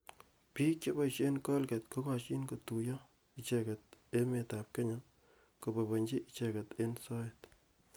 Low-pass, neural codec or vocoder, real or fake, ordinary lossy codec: none; none; real; none